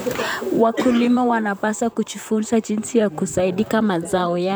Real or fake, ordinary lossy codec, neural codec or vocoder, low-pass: fake; none; vocoder, 44.1 kHz, 128 mel bands, Pupu-Vocoder; none